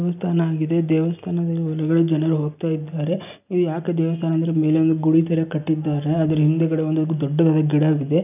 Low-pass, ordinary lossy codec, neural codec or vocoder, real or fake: 3.6 kHz; none; none; real